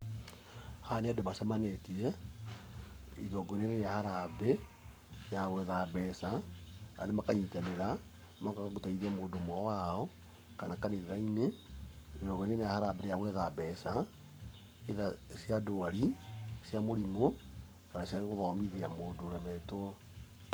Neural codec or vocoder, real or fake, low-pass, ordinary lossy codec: codec, 44.1 kHz, 7.8 kbps, Pupu-Codec; fake; none; none